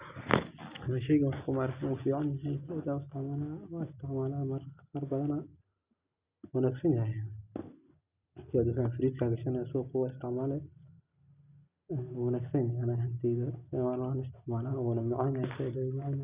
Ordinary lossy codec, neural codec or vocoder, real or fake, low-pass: Opus, 64 kbps; none; real; 3.6 kHz